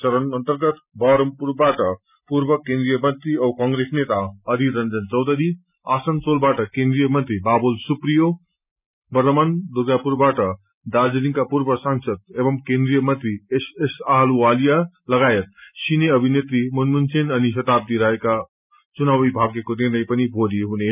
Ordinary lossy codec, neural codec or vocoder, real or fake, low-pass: none; none; real; 3.6 kHz